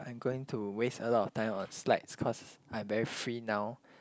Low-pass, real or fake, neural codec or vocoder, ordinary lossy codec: none; real; none; none